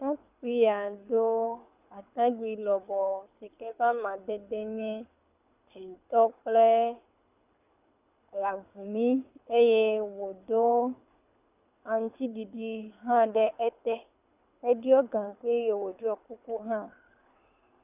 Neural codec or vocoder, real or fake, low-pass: codec, 24 kHz, 6 kbps, HILCodec; fake; 3.6 kHz